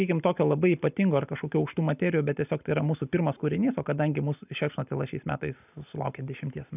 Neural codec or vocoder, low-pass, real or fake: none; 3.6 kHz; real